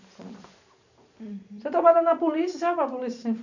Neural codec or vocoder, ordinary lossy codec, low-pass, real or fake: vocoder, 44.1 kHz, 128 mel bands every 512 samples, BigVGAN v2; none; 7.2 kHz; fake